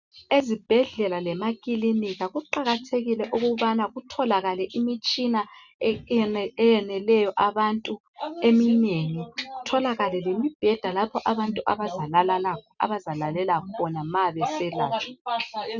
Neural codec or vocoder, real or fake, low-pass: none; real; 7.2 kHz